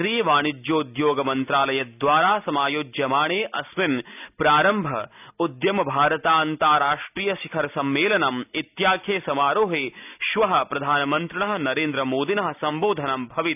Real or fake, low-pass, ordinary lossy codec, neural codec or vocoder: real; 3.6 kHz; none; none